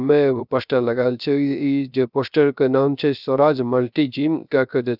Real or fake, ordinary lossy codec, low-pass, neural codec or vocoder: fake; none; 5.4 kHz; codec, 16 kHz, 0.3 kbps, FocalCodec